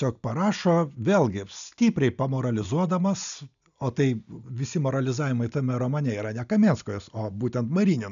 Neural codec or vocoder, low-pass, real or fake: none; 7.2 kHz; real